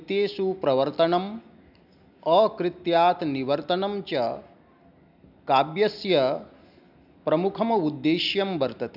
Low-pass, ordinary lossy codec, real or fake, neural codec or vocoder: 5.4 kHz; none; real; none